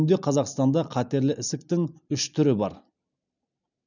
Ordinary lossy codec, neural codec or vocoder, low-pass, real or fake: none; none; 7.2 kHz; real